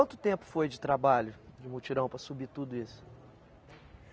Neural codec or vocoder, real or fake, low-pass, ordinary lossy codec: none; real; none; none